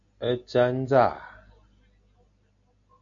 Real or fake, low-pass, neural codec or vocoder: real; 7.2 kHz; none